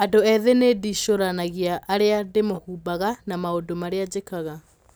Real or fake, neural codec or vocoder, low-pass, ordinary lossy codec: real; none; none; none